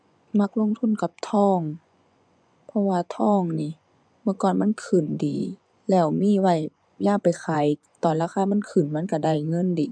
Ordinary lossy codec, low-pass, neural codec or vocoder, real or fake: none; 9.9 kHz; vocoder, 24 kHz, 100 mel bands, Vocos; fake